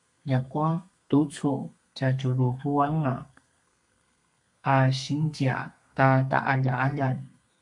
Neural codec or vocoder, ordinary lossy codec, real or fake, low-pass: codec, 32 kHz, 1.9 kbps, SNAC; MP3, 96 kbps; fake; 10.8 kHz